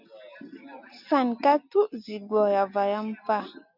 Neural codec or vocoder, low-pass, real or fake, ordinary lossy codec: none; 5.4 kHz; real; MP3, 48 kbps